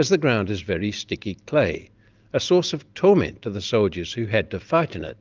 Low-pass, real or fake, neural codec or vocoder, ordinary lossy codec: 7.2 kHz; real; none; Opus, 24 kbps